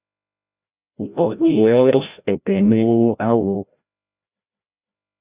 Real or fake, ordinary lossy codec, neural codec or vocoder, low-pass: fake; Opus, 64 kbps; codec, 16 kHz, 0.5 kbps, FreqCodec, larger model; 3.6 kHz